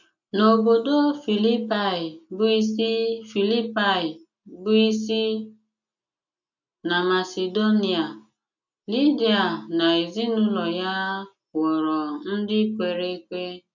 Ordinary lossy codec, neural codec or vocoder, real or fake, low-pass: none; none; real; 7.2 kHz